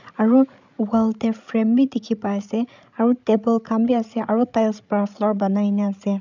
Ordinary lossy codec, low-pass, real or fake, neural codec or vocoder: none; 7.2 kHz; fake; codec, 16 kHz, 16 kbps, FreqCodec, larger model